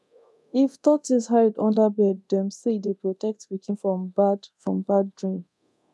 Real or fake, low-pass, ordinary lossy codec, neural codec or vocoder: fake; none; none; codec, 24 kHz, 0.9 kbps, DualCodec